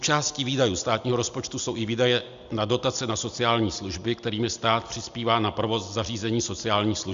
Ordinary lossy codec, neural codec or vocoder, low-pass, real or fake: Opus, 64 kbps; none; 7.2 kHz; real